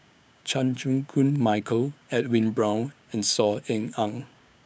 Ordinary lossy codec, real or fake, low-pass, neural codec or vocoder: none; fake; none; codec, 16 kHz, 6 kbps, DAC